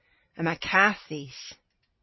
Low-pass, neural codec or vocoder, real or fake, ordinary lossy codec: 7.2 kHz; none; real; MP3, 24 kbps